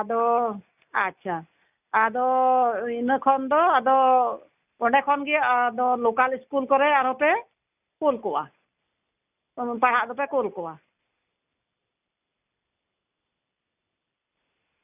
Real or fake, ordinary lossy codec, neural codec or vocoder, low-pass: real; none; none; 3.6 kHz